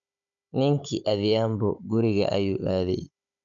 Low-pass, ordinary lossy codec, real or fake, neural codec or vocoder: 7.2 kHz; none; fake; codec, 16 kHz, 16 kbps, FunCodec, trained on Chinese and English, 50 frames a second